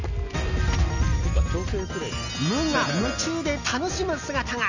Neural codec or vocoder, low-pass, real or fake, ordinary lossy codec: none; 7.2 kHz; real; none